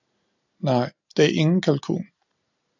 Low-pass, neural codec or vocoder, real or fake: 7.2 kHz; none; real